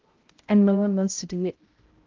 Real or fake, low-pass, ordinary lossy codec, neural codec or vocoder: fake; 7.2 kHz; Opus, 16 kbps; codec, 16 kHz, 0.5 kbps, X-Codec, HuBERT features, trained on balanced general audio